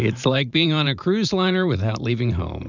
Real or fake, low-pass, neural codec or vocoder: fake; 7.2 kHz; vocoder, 44.1 kHz, 128 mel bands every 512 samples, BigVGAN v2